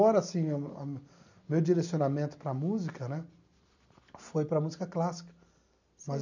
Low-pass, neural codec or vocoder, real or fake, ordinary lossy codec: 7.2 kHz; none; real; none